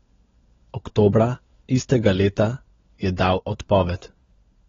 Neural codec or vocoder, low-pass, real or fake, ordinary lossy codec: codec, 16 kHz, 16 kbps, FunCodec, trained on LibriTTS, 50 frames a second; 7.2 kHz; fake; AAC, 24 kbps